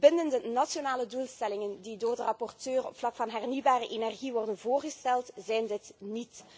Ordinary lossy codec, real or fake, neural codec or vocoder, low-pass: none; real; none; none